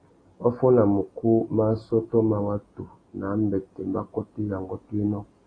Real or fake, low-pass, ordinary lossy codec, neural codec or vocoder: real; 9.9 kHz; AAC, 32 kbps; none